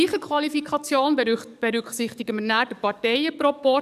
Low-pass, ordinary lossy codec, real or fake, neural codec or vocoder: 14.4 kHz; none; fake; codec, 44.1 kHz, 7.8 kbps, DAC